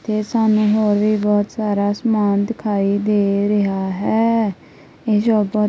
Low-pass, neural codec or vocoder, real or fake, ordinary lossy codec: none; none; real; none